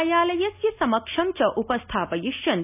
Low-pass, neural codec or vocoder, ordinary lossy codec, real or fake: 3.6 kHz; none; none; real